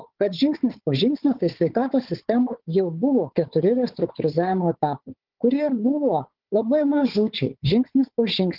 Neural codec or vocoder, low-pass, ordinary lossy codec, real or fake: codec, 16 kHz, 4 kbps, FunCodec, trained on Chinese and English, 50 frames a second; 5.4 kHz; Opus, 16 kbps; fake